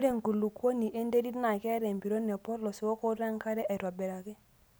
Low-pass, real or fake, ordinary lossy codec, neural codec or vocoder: none; real; none; none